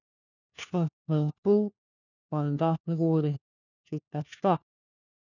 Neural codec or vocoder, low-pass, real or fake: codec, 16 kHz, 1 kbps, FunCodec, trained on LibriTTS, 50 frames a second; 7.2 kHz; fake